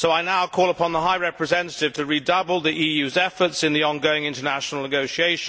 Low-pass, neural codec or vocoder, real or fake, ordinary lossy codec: none; none; real; none